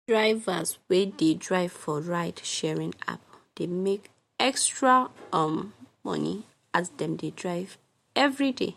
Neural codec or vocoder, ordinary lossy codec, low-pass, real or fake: none; MP3, 64 kbps; 19.8 kHz; real